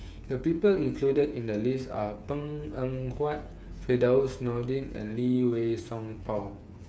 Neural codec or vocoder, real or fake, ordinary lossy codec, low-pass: codec, 16 kHz, 8 kbps, FreqCodec, smaller model; fake; none; none